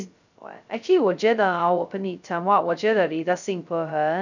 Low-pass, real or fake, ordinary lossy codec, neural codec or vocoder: 7.2 kHz; fake; none; codec, 16 kHz, 0.2 kbps, FocalCodec